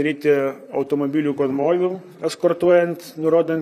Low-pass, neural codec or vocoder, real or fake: 14.4 kHz; vocoder, 44.1 kHz, 128 mel bands, Pupu-Vocoder; fake